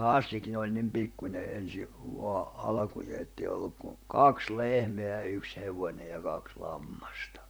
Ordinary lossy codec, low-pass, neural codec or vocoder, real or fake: none; none; vocoder, 44.1 kHz, 128 mel bands, Pupu-Vocoder; fake